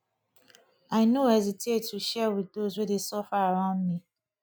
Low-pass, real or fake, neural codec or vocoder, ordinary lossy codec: none; real; none; none